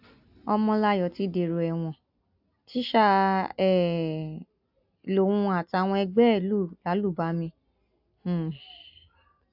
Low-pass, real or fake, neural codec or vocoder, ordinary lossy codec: 5.4 kHz; real; none; AAC, 48 kbps